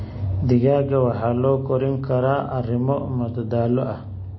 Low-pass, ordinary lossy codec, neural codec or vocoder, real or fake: 7.2 kHz; MP3, 24 kbps; none; real